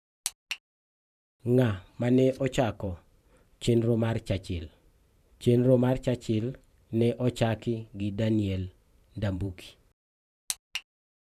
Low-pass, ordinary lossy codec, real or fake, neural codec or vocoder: 14.4 kHz; none; fake; vocoder, 44.1 kHz, 128 mel bands every 512 samples, BigVGAN v2